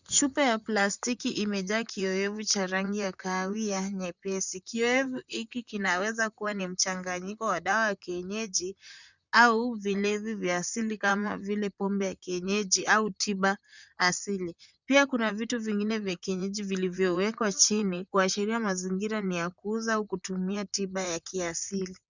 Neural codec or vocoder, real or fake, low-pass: vocoder, 44.1 kHz, 128 mel bands, Pupu-Vocoder; fake; 7.2 kHz